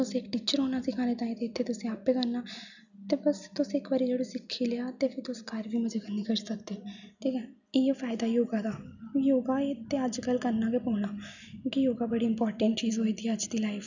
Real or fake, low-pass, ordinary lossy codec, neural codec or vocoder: real; 7.2 kHz; none; none